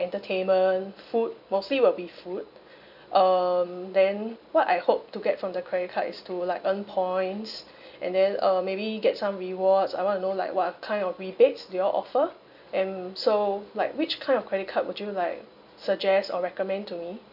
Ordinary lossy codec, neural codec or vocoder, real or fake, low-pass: none; none; real; 5.4 kHz